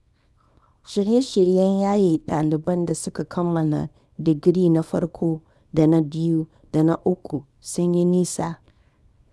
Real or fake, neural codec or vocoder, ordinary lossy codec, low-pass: fake; codec, 24 kHz, 0.9 kbps, WavTokenizer, small release; none; none